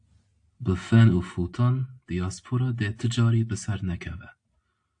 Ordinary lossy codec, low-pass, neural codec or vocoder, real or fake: AAC, 64 kbps; 9.9 kHz; none; real